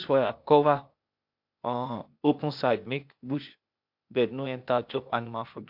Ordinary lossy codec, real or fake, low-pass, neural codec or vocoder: MP3, 48 kbps; fake; 5.4 kHz; codec, 16 kHz, 0.8 kbps, ZipCodec